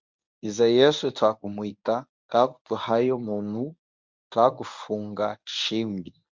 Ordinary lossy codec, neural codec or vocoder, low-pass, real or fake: MP3, 64 kbps; codec, 24 kHz, 0.9 kbps, WavTokenizer, medium speech release version 1; 7.2 kHz; fake